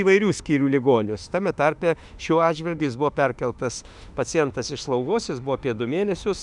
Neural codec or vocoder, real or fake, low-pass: autoencoder, 48 kHz, 32 numbers a frame, DAC-VAE, trained on Japanese speech; fake; 10.8 kHz